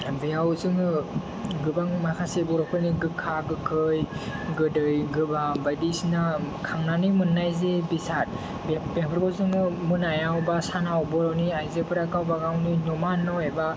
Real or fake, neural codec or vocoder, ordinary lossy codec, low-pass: real; none; none; none